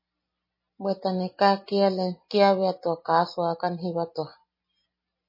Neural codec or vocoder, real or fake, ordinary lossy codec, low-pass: none; real; MP3, 24 kbps; 5.4 kHz